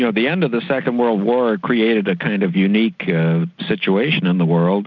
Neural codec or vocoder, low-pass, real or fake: none; 7.2 kHz; real